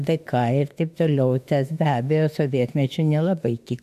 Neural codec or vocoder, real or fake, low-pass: autoencoder, 48 kHz, 32 numbers a frame, DAC-VAE, trained on Japanese speech; fake; 14.4 kHz